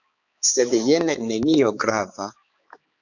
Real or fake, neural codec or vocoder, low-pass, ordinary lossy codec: fake; codec, 16 kHz, 4 kbps, X-Codec, HuBERT features, trained on balanced general audio; 7.2 kHz; AAC, 48 kbps